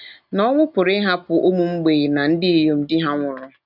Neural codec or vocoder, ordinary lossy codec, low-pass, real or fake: none; none; 5.4 kHz; real